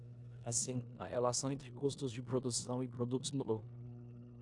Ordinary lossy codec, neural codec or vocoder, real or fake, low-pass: AAC, 64 kbps; codec, 16 kHz in and 24 kHz out, 0.9 kbps, LongCat-Audio-Codec, four codebook decoder; fake; 10.8 kHz